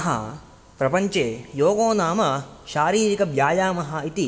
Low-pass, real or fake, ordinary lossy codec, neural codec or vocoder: none; real; none; none